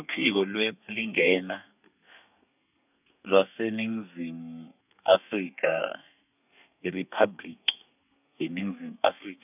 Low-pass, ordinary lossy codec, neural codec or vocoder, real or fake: 3.6 kHz; none; codec, 32 kHz, 1.9 kbps, SNAC; fake